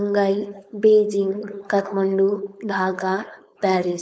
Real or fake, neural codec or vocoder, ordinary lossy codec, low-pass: fake; codec, 16 kHz, 4.8 kbps, FACodec; none; none